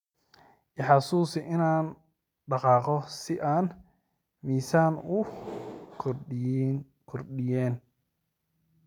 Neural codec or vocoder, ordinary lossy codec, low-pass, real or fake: none; none; 19.8 kHz; real